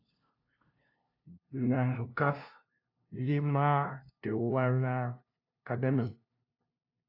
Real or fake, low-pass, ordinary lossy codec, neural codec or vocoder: fake; 5.4 kHz; AAC, 32 kbps; codec, 16 kHz, 0.5 kbps, FunCodec, trained on LibriTTS, 25 frames a second